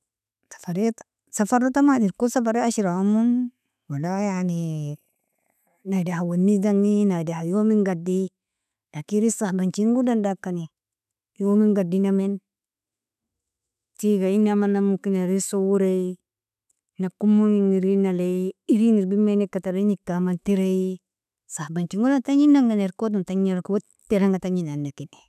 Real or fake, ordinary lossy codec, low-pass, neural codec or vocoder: fake; none; 14.4 kHz; autoencoder, 48 kHz, 128 numbers a frame, DAC-VAE, trained on Japanese speech